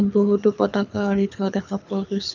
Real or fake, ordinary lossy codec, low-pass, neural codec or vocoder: fake; none; 7.2 kHz; codec, 24 kHz, 6 kbps, HILCodec